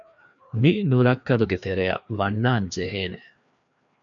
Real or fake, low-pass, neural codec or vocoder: fake; 7.2 kHz; codec, 16 kHz, 2 kbps, FreqCodec, larger model